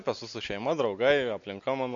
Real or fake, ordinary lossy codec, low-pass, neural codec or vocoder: real; MP3, 48 kbps; 7.2 kHz; none